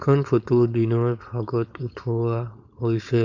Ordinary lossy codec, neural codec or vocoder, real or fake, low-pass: none; codec, 16 kHz, 4.8 kbps, FACodec; fake; 7.2 kHz